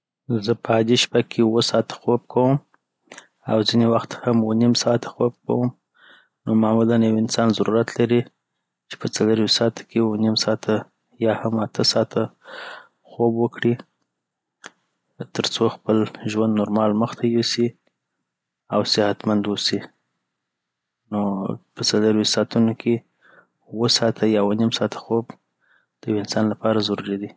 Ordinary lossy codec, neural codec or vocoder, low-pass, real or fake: none; none; none; real